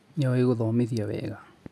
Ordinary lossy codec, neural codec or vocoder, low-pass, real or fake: none; none; none; real